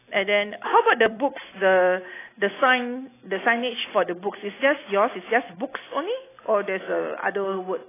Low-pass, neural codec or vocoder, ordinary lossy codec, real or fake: 3.6 kHz; none; AAC, 16 kbps; real